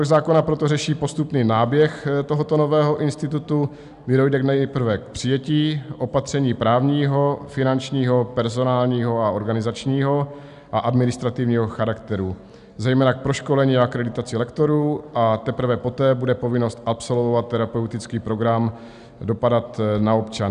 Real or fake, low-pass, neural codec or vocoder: real; 10.8 kHz; none